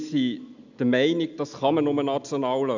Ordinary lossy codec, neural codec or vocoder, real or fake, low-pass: none; none; real; 7.2 kHz